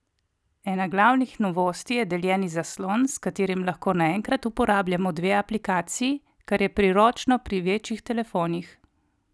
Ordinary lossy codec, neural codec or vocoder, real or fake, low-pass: none; none; real; none